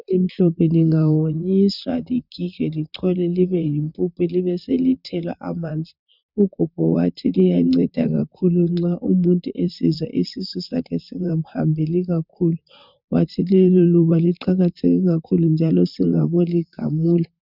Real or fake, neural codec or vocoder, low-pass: fake; vocoder, 44.1 kHz, 128 mel bands, Pupu-Vocoder; 5.4 kHz